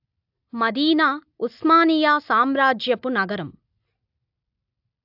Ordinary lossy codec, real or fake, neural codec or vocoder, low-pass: AAC, 48 kbps; real; none; 5.4 kHz